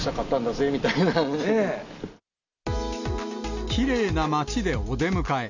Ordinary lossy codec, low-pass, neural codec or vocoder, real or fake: none; 7.2 kHz; none; real